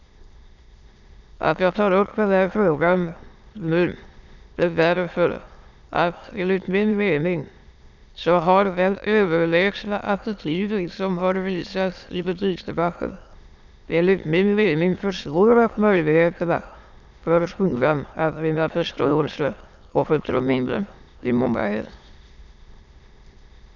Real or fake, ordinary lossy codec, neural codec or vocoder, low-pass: fake; none; autoencoder, 22.05 kHz, a latent of 192 numbers a frame, VITS, trained on many speakers; 7.2 kHz